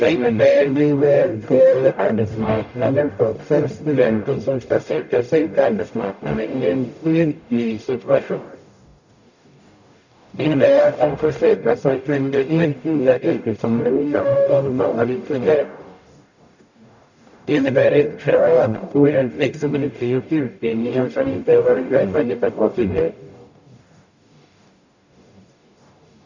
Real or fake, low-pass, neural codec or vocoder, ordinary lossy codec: fake; 7.2 kHz; codec, 44.1 kHz, 0.9 kbps, DAC; none